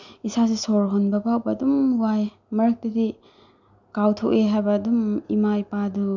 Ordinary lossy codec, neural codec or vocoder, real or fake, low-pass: none; none; real; 7.2 kHz